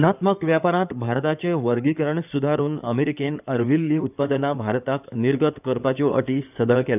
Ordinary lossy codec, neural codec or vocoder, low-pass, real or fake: none; codec, 16 kHz in and 24 kHz out, 2.2 kbps, FireRedTTS-2 codec; 3.6 kHz; fake